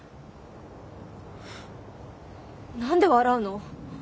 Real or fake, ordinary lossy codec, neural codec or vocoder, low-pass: real; none; none; none